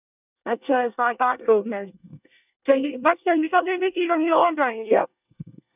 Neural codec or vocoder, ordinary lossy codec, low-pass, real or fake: codec, 24 kHz, 1 kbps, SNAC; none; 3.6 kHz; fake